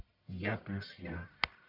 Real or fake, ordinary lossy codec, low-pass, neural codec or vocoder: fake; none; 5.4 kHz; codec, 44.1 kHz, 1.7 kbps, Pupu-Codec